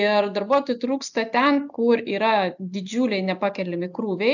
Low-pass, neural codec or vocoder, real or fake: 7.2 kHz; none; real